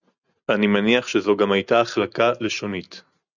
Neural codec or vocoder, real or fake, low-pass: none; real; 7.2 kHz